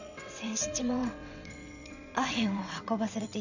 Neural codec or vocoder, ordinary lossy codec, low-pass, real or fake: vocoder, 22.05 kHz, 80 mel bands, WaveNeXt; none; 7.2 kHz; fake